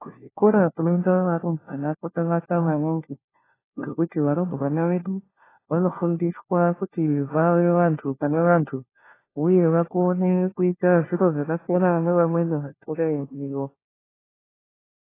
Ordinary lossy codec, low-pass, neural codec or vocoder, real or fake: AAC, 16 kbps; 3.6 kHz; codec, 16 kHz, 1 kbps, FunCodec, trained on LibriTTS, 50 frames a second; fake